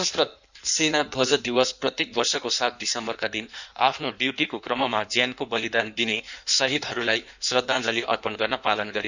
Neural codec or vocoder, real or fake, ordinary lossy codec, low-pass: codec, 16 kHz in and 24 kHz out, 1.1 kbps, FireRedTTS-2 codec; fake; none; 7.2 kHz